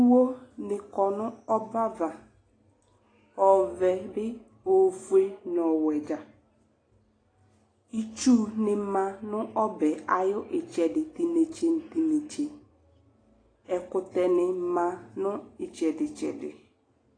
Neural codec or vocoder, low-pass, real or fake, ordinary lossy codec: none; 9.9 kHz; real; AAC, 32 kbps